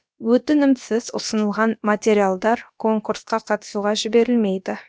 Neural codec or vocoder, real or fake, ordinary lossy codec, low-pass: codec, 16 kHz, about 1 kbps, DyCAST, with the encoder's durations; fake; none; none